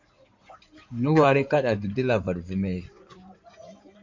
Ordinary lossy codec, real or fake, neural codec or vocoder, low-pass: MP3, 64 kbps; fake; codec, 16 kHz in and 24 kHz out, 2.2 kbps, FireRedTTS-2 codec; 7.2 kHz